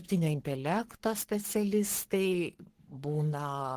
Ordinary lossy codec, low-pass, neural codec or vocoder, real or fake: Opus, 16 kbps; 14.4 kHz; codec, 44.1 kHz, 3.4 kbps, Pupu-Codec; fake